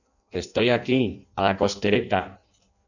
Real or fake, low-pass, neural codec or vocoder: fake; 7.2 kHz; codec, 16 kHz in and 24 kHz out, 0.6 kbps, FireRedTTS-2 codec